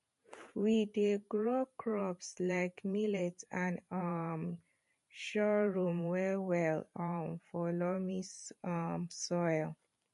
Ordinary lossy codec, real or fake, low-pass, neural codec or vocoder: MP3, 48 kbps; fake; 14.4 kHz; vocoder, 44.1 kHz, 128 mel bands every 512 samples, BigVGAN v2